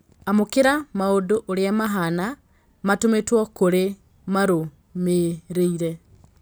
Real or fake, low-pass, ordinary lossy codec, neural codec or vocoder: real; none; none; none